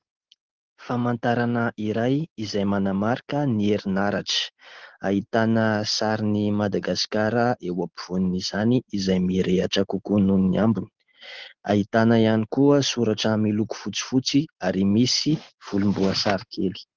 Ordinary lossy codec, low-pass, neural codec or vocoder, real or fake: Opus, 16 kbps; 7.2 kHz; none; real